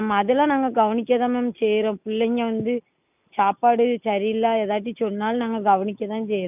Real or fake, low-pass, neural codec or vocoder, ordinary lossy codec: real; 3.6 kHz; none; none